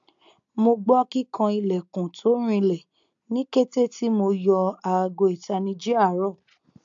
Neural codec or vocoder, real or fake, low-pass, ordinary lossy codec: none; real; 7.2 kHz; AAC, 64 kbps